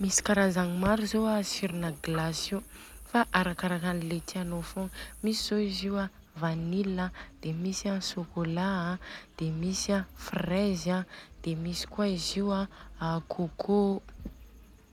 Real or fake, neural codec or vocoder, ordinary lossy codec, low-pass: real; none; none; 19.8 kHz